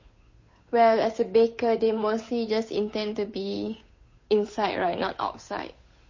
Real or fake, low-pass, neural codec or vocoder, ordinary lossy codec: fake; 7.2 kHz; codec, 16 kHz, 8 kbps, FunCodec, trained on Chinese and English, 25 frames a second; MP3, 32 kbps